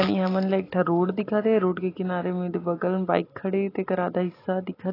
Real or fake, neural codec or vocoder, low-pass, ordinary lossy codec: real; none; 5.4 kHz; AAC, 24 kbps